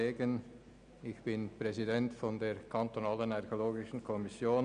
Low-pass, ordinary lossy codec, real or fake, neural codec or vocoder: 9.9 kHz; none; real; none